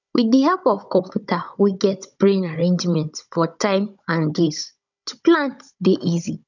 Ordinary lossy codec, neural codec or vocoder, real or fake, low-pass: none; codec, 16 kHz, 16 kbps, FunCodec, trained on Chinese and English, 50 frames a second; fake; 7.2 kHz